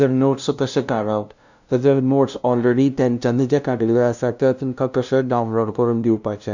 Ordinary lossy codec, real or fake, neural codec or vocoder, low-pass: none; fake; codec, 16 kHz, 0.5 kbps, FunCodec, trained on LibriTTS, 25 frames a second; 7.2 kHz